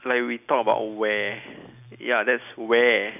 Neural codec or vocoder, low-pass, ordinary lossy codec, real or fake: none; 3.6 kHz; none; real